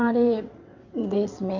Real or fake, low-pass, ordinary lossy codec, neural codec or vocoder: fake; 7.2 kHz; none; vocoder, 22.05 kHz, 80 mel bands, WaveNeXt